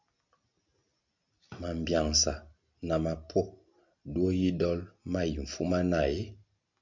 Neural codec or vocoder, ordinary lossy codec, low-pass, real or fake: none; MP3, 64 kbps; 7.2 kHz; real